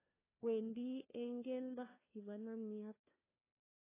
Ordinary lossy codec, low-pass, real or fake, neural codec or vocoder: AAC, 16 kbps; 3.6 kHz; fake; codec, 16 kHz, 1 kbps, FunCodec, trained on LibriTTS, 50 frames a second